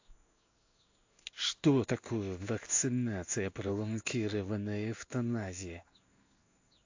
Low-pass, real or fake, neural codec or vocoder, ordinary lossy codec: 7.2 kHz; fake; codec, 16 kHz in and 24 kHz out, 1 kbps, XY-Tokenizer; AAC, 48 kbps